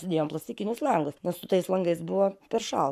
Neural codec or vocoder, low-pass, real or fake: codec, 44.1 kHz, 7.8 kbps, Pupu-Codec; 14.4 kHz; fake